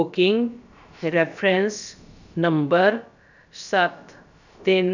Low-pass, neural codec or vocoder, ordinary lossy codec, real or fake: 7.2 kHz; codec, 16 kHz, about 1 kbps, DyCAST, with the encoder's durations; none; fake